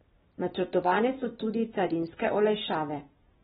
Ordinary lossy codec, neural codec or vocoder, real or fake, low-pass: AAC, 16 kbps; none; real; 10.8 kHz